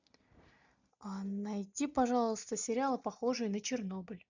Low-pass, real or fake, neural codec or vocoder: 7.2 kHz; real; none